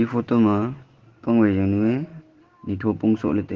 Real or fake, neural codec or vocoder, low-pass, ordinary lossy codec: real; none; 7.2 kHz; Opus, 16 kbps